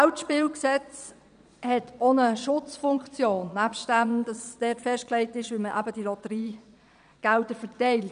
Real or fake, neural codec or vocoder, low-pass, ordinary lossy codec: fake; vocoder, 22.05 kHz, 80 mel bands, Vocos; 9.9 kHz; none